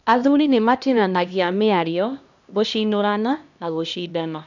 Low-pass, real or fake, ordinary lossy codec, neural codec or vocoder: 7.2 kHz; fake; none; codec, 16 kHz, 1 kbps, X-Codec, HuBERT features, trained on LibriSpeech